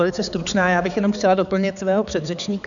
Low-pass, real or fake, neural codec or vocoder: 7.2 kHz; fake; codec, 16 kHz, 4 kbps, X-Codec, HuBERT features, trained on balanced general audio